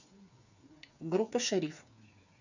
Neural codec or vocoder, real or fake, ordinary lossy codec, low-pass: codec, 16 kHz, 8 kbps, FreqCodec, smaller model; fake; none; 7.2 kHz